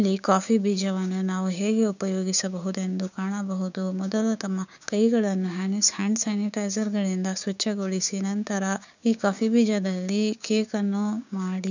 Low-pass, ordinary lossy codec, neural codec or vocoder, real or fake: 7.2 kHz; none; codec, 16 kHz, 6 kbps, DAC; fake